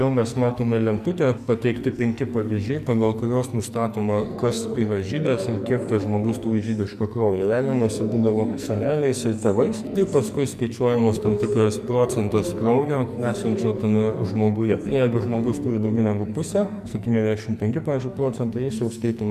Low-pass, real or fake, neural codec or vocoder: 14.4 kHz; fake; codec, 44.1 kHz, 2.6 kbps, SNAC